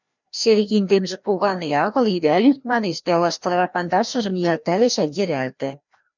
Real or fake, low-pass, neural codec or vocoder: fake; 7.2 kHz; codec, 16 kHz, 1 kbps, FreqCodec, larger model